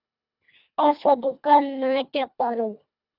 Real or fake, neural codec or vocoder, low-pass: fake; codec, 24 kHz, 1.5 kbps, HILCodec; 5.4 kHz